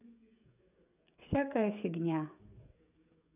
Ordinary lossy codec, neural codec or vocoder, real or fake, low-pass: none; codec, 16 kHz, 16 kbps, FreqCodec, smaller model; fake; 3.6 kHz